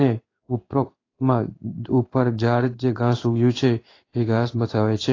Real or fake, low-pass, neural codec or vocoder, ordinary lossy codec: fake; 7.2 kHz; codec, 16 kHz in and 24 kHz out, 1 kbps, XY-Tokenizer; AAC, 32 kbps